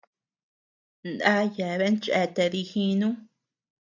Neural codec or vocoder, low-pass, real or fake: none; 7.2 kHz; real